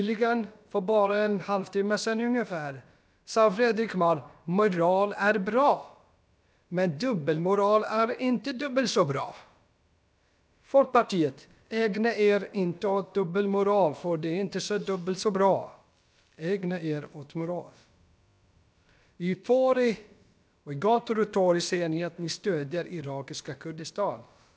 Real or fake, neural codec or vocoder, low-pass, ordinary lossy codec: fake; codec, 16 kHz, about 1 kbps, DyCAST, with the encoder's durations; none; none